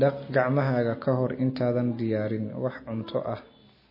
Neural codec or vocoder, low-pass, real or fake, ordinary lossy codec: none; 5.4 kHz; real; MP3, 24 kbps